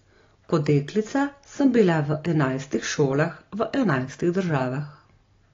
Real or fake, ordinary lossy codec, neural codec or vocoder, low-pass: real; AAC, 32 kbps; none; 7.2 kHz